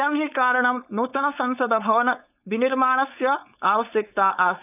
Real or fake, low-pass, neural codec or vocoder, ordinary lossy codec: fake; 3.6 kHz; codec, 16 kHz, 4.8 kbps, FACodec; none